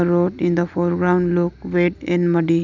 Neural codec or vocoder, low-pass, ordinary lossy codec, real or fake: none; 7.2 kHz; none; real